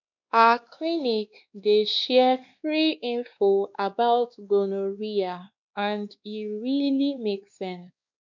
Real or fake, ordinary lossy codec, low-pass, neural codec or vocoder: fake; none; 7.2 kHz; codec, 16 kHz, 2 kbps, X-Codec, WavLM features, trained on Multilingual LibriSpeech